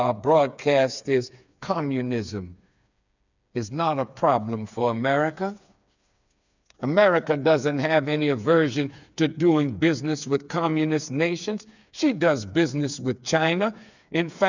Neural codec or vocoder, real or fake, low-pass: codec, 16 kHz, 4 kbps, FreqCodec, smaller model; fake; 7.2 kHz